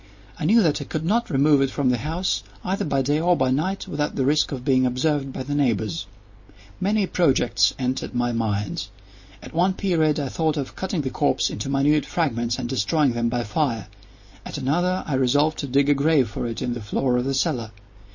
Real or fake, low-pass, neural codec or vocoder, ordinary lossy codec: real; 7.2 kHz; none; MP3, 32 kbps